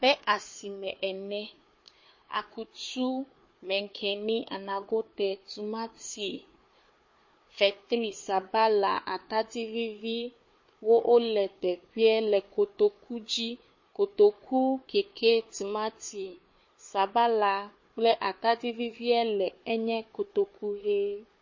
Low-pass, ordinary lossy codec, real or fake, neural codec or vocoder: 7.2 kHz; MP3, 32 kbps; fake; codec, 16 kHz, 4 kbps, FunCodec, trained on Chinese and English, 50 frames a second